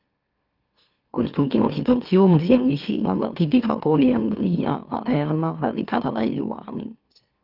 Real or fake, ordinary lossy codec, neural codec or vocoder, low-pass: fake; Opus, 24 kbps; autoencoder, 44.1 kHz, a latent of 192 numbers a frame, MeloTTS; 5.4 kHz